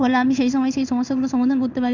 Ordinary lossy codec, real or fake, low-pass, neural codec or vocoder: none; fake; 7.2 kHz; codec, 16 kHz in and 24 kHz out, 1 kbps, XY-Tokenizer